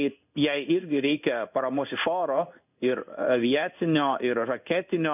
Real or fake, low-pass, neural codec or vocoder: fake; 3.6 kHz; codec, 16 kHz in and 24 kHz out, 1 kbps, XY-Tokenizer